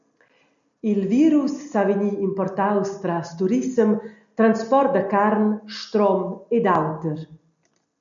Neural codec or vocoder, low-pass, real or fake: none; 7.2 kHz; real